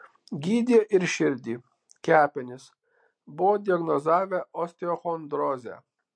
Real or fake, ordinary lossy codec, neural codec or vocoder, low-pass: real; MP3, 48 kbps; none; 9.9 kHz